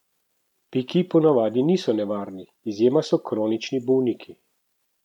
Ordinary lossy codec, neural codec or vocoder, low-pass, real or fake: none; none; 19.8 kHz; real